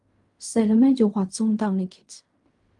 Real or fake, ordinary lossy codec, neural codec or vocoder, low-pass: fake; Opus, 32 kbps; codec, 16 kHz in and 24 kHz out, 0.4 kbps, LongCat-Audio-Codec, fine tuned four codebook decoder; 10.8 kHz